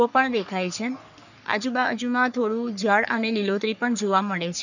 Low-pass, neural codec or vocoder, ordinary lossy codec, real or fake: 7.2 kHz; codec, 44.1 kHz, 3.4 kbps, Pupu-Codec; none; fake